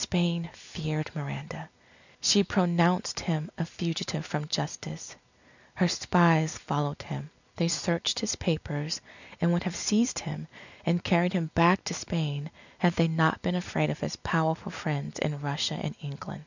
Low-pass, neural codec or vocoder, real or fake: 7.2 kHz; none; real